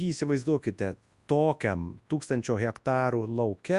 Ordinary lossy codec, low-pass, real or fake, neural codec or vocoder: Opus, 64 kbps; 10.8 kHz; fake; codec, 24 kHz, 0.9 kbps, WavTokenizer, large speech release